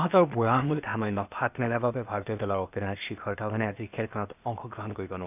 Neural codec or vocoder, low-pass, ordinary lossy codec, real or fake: codec, 16 kHz, 0.8 kbps, ZipCodec; 3.6 kHz; none; fake